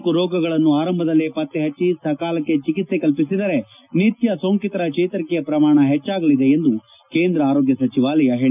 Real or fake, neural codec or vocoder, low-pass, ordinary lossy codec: real; none; 3.6 kHz; none